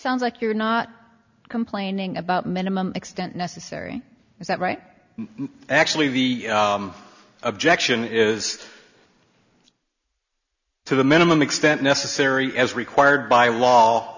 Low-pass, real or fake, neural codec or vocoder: 7.2 kHz; real; none